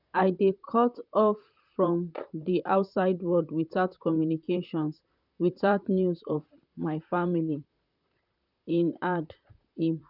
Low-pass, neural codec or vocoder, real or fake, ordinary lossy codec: 5.4 kHz; vocoder, 44.1 kHz, 128 mel bands, Pupu-Vocoder; fake; none